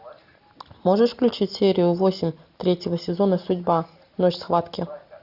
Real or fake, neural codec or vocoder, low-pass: real; none; 5.4 kHz